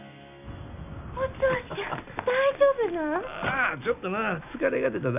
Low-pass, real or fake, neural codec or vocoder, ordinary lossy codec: 3.6 kHz; real; none; none